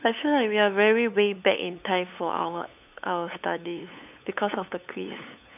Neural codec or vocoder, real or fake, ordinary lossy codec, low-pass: codec, 16 kHz, 8 kbps, FunCodec, trained on LibriTTS, 25 frames a second; fake; none; 3.6 kHz